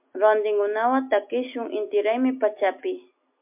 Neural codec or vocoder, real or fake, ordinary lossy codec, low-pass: none; real; MP3, 32 kbps; 3.6 kHz